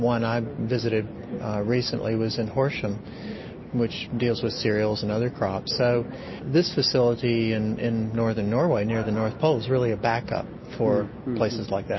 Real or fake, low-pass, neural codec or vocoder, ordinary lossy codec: real; 7.2 kHz; none; MP3, 24 kbps